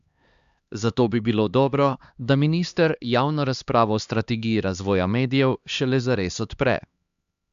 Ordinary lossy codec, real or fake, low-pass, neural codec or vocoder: Opus, 64 kbps; fake; 7.2 kHz; codec, 16 kHz, 2 kbps, X-Codec, HuBERT features, trained on LibriSpeech